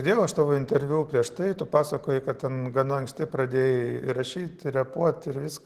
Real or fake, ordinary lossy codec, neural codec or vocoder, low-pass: real; Opus, 16 kbps; none; 14.4 kHz